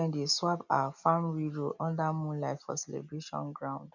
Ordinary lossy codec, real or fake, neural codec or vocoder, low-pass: none; real; none; 7.2 kHz